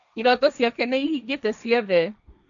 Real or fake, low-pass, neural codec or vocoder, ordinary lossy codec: fake; 7.2 kHz; codec, 16 kHz, 1.1 kbps, Voila-Tokenizer; none